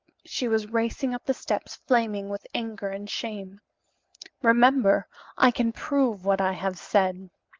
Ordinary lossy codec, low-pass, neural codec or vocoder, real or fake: Opus, 32 kbps; 7.2 kHz; none; real